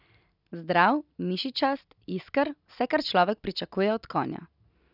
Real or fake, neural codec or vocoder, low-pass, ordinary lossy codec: real; none; 5.4 kHz; none